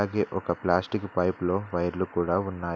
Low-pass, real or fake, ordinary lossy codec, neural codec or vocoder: none; real; none; none